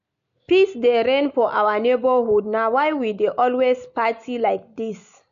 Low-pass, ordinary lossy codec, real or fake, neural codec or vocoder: 7.2 kHz; AAC, 96 kbps; real; none